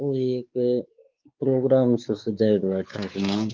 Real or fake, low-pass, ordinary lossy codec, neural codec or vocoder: fake; 7.2 kHz; Opus, 32 kbps; codec, 16 kHz, 4 kbps, X-Codec, WavLM features, trained on Multilingual LibriSpeech